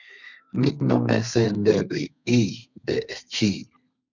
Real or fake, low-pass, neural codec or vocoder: fake; 7.2 kHz; codec, 32 kHz, 1.9 kbps, SNAC